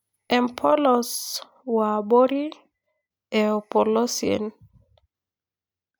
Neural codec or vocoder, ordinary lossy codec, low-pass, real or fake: none; none; none; real